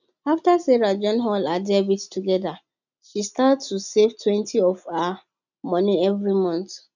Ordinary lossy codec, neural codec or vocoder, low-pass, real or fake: none; none; 7.2 kHz; real